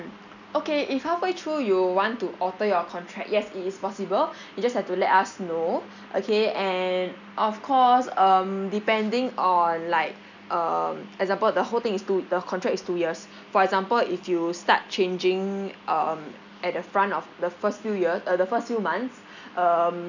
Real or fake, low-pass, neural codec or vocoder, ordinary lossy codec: real; 7.2 kHz; none; none